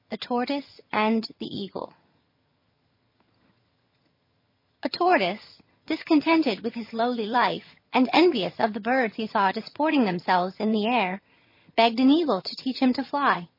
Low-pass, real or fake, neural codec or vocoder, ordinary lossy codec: 5.4 kHz; fake; vocoder, 22.05 kHz, 80 mel bands, HiFi-GAN; MP3, 24 kbps